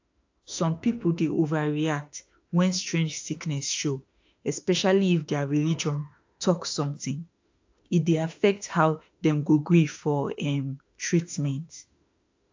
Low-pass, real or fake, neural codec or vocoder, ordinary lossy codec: 7.2 kHz; fake; autoencoder, 48 kHz, 32 numbers a frame, DAC-VAE, trained on Japanese speech; AAC, 48 kbps